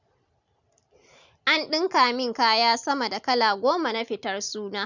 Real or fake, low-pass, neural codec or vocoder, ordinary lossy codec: real; 7.2 kHz; none; none